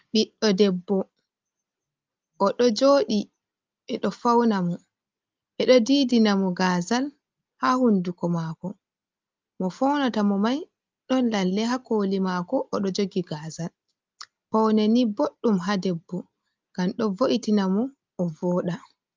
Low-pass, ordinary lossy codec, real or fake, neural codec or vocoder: 7.2 kHz; Opus, 24 kbps; real; none